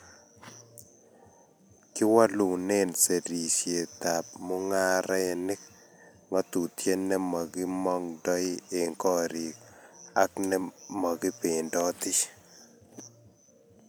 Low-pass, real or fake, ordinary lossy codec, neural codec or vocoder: none; real; none; none